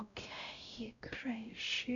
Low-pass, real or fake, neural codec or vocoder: 7.2 kHz; fake; codec, 16 kHz, 0.5 kbps, X-Codec, WavLM features, trained on Multilingual LibriSpeech